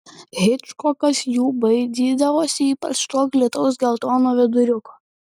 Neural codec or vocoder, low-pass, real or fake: none; 19.8 kHz; real